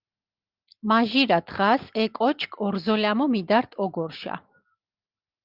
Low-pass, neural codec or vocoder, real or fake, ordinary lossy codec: 5.4 kHz; none; real; Opus, 32 kbps